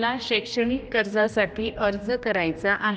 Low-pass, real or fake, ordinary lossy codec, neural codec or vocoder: none; fake; none; codec, 16 kHz, 1 kbps, X-Codec, HuBERT features, trained on general audio